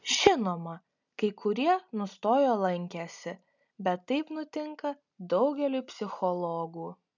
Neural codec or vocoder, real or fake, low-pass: none; real; 7.2 kHz